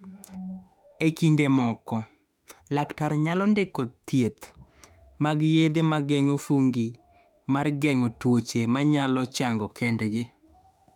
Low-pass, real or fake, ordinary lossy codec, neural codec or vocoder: 19.8 kHz; fake; none; autoencoder, 48 kHz, 32 numbers a frame, DAC-VAE, trained on Japanese speech